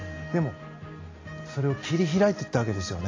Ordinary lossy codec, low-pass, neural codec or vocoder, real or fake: AAC, 32 kbps; 7.2 kHz; none; real